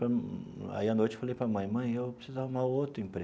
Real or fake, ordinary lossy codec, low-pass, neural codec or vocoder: real; none; none; none